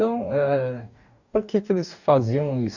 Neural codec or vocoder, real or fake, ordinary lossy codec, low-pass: codec, 44.1 kHz, 2.6 kbps, DAC; fake; none; 7.2 kHz